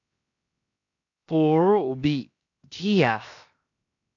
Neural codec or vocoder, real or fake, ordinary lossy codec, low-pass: codec, 16 kHz, 0.7 kbps, FocalCodec; fake; AAC, 48 kbps; 7.2 kHz